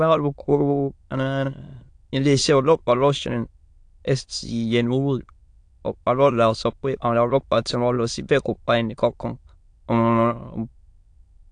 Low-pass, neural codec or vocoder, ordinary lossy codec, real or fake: 9.9 kHz; autoencoder, 22.05 kHz, a latent of 192 numbers a frame, VITS, trained on many speakers; AAC, 64 kbps; fake